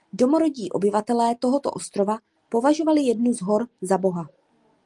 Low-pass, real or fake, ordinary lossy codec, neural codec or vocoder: 10.8 kHz; real; Opus, 32 kbps; none